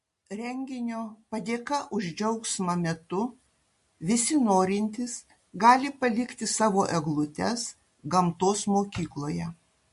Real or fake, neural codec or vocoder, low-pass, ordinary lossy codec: real; none; 14.4 kHz; MP3, 48 kbps